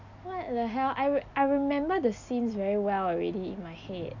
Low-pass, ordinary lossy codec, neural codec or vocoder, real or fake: 7.2 kHz; none; none; real